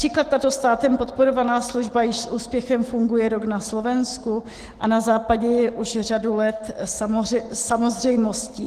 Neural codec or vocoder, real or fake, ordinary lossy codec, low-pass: autoencoder, 48 kHz, 128 numbers a frame, DAC-VAE, trained on Japanese speech; fake; Opus, 16 kbps; 14.4 kHz